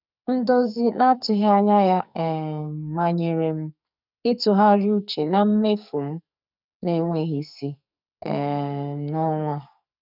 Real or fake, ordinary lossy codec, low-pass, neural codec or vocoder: fake; none; 5.4 kHz; codec, 44.1 kHz, 2.6 kbps, SNAC